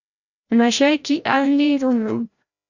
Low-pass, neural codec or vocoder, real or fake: 7.2 kHz; codec, 16 kHz, 0.5 kbps, FreqCodec, larger model; fake